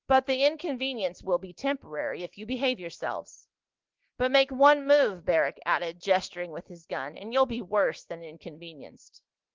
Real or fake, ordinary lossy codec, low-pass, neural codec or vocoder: real; Opus, 16 kbps; 7.2 kHz; none